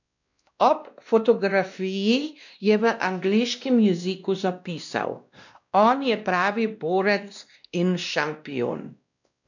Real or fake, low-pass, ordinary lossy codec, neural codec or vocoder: fake; 7.2 kHz; none; codec, 16 kHz, 1 kbps, X-Codec, WavLM features, trained on Multilingual LibriSpeech